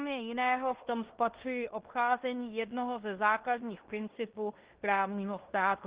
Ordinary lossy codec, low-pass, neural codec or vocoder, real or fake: Opus, 16 kbps; 3.6 kHz; codec, 16 kHz in and 24 kHz out, 0.9 kbps, LongCat-Audio-Codec, fine tuned four codebook decoder; fake